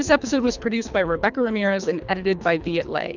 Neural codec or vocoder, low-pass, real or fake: codec, 24 kHz, 3 kbps, HILCodec; 7.2 kHz; fake